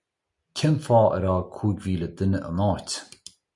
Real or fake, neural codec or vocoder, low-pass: real; none; 10.8 kHz